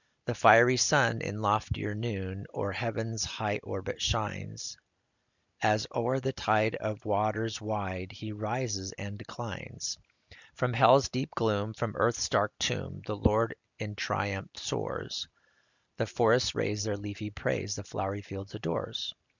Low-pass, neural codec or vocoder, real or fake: 7.2 kHz; none; real